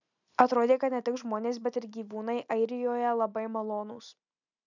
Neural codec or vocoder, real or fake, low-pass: none; real; 7.2 kHz